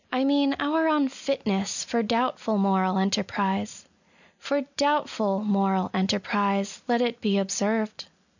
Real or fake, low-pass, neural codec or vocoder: real; 7.2 kHz; none